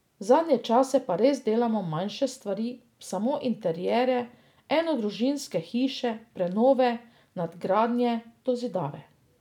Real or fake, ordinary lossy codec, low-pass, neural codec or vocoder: real; none; 19.8 kHz; none